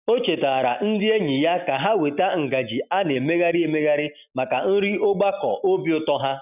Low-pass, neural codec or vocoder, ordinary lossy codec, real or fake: 3.6 kHz; none; none; real